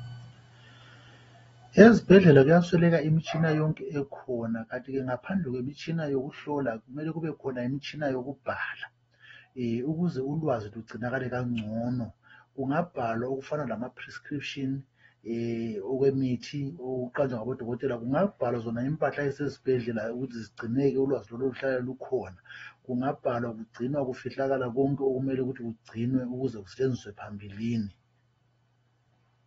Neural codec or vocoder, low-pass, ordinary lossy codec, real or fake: none; 19.8 kHz; AAC, 24 kbps; real